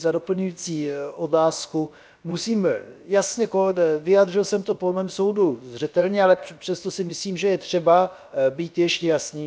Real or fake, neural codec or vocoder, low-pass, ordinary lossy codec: fake; codec, 16 kHz, about 1 kbps, DyCAST, with the encoder's durations; none; none